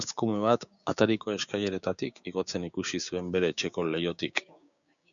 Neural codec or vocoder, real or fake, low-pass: codec, 16 kHz, 6 kbps, DAC; fake; 7.2 kHz